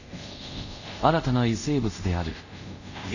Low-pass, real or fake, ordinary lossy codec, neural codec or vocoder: 7.2 kHz; fake; none; codec, 24 kHz, 0.5 kbps, DualCodec